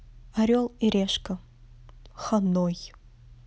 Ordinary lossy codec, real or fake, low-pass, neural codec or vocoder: none; real; none; none